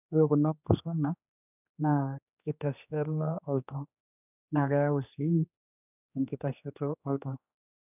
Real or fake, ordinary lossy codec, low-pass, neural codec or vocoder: fake; none; 3.6 kHz; codec, 16 kHz, 2 kbps, X-Codec, HuBERT features, trained on general audio